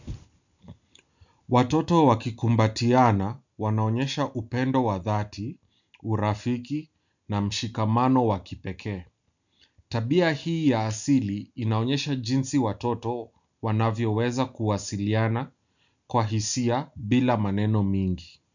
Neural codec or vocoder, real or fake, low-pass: none; real; 7.2 kHz